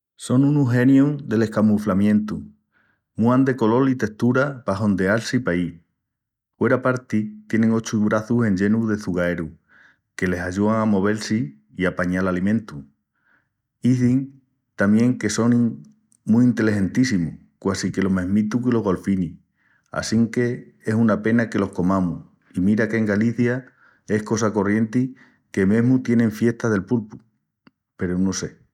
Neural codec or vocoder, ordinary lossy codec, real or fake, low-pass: none; none; real; 19.8 kHz